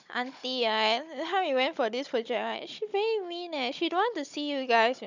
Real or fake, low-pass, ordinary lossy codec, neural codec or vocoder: fake; 7.2 kHz; none; codec, 16 kHz, 16 kbps, FunCodec, trained on Chinese and English, 50 frames a second